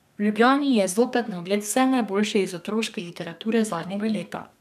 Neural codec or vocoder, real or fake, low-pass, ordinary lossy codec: codec, 32 kHz, 1.9 kbps, SNAC; fake; 14.4 kHz; none